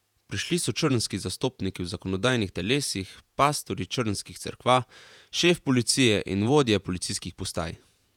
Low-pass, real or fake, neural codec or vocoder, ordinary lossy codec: 19.8 kHz; fake; vocoder, 48 kHz, 128 mel bands, Vocos; none